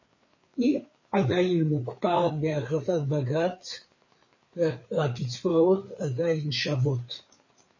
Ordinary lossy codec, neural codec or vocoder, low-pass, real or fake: MP3, 32 kbps; codec, 16 kHz, 4 kbps, FreqCodec, larger model; 7.2 kHz; fake